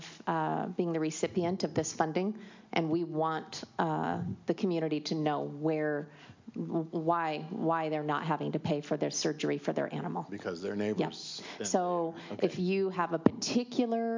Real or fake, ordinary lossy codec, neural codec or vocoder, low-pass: real; MP3, 64 kbps; none; 7.2 kHz